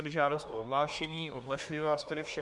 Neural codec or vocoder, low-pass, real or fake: codec, 24 kHz, 1 kbps, SNAC; 10.8 kHz; fake